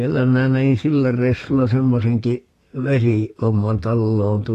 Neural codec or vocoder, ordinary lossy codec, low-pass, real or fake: codec, 32 kHz, 1.9 kbps, SNAC; AAC, 48 kbps; 14.4 kHz; fake